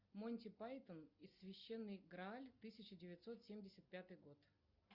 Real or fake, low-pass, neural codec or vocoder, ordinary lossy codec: real; 5.4 kHz; none; Opus, 64 kbps